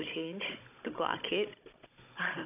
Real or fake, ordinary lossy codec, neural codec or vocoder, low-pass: fake; AAC, 32 kbps; codec, 16 kHz, 16 kbps, FunCodec, trained on Chinese and English, 50 frames a second; 3.6 kHz